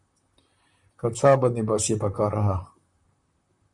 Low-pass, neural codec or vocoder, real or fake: 10.8 kHz; vocoder, 44.1 kHz, 128 mel bands, Pupu-Vocoder; fake